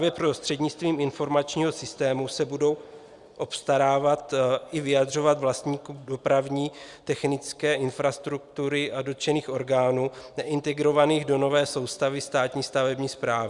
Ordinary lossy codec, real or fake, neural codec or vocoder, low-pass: Opus, 64 kbps; real; none; 10.8 kHz